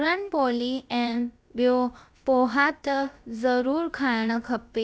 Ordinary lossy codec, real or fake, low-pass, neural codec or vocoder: none; fake; none; codec, 16 kHz, about 1 kbps, DyCAST, with the encoder's durations